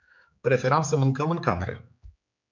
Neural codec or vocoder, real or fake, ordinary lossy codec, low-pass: codec, 16 kHz, 4 kbps, X-Codec, HuBERT features, trained on general audio; fake; MP3, 64 kbps; 7.2 kHz